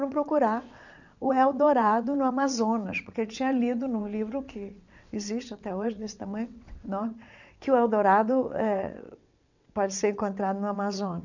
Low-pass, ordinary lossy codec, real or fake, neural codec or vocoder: 7.2 kHz; none; fake; vocoder, 22.05 kHz, 80 mel bands, WaveNeXt